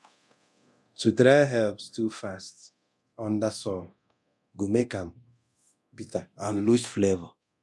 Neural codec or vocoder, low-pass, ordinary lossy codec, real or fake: codec, 24 kHz, 0.9 kbps, DualCodec; none; none; fake